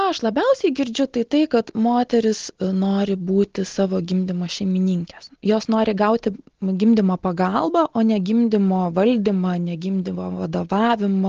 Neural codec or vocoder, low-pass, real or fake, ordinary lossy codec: none; 7.2 kHz; real; Opus, 16 kbps